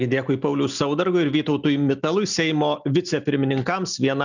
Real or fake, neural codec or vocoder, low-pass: real; none; 7.2 kHz